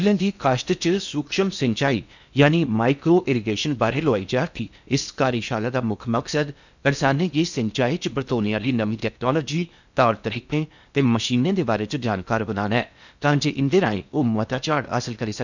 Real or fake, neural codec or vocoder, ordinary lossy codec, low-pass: fake; codec, 16 kHz in and 24 kHz out, 0.8 kbps, FocalCodec, streaming, 65536 codes; none; 7.2 kHz